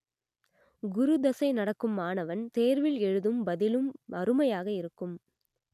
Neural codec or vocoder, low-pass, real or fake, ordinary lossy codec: none; 14.4 kHz; real; none